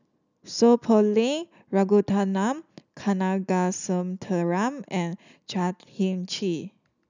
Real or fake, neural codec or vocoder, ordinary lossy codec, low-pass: real; none; none; 7.2 kHz